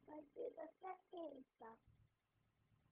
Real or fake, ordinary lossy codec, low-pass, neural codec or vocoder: fake; Opus, 24 kbps; 3.6 kHz; codec, 16 kHz, 0.4 kbps, LongCat-Audio-Codec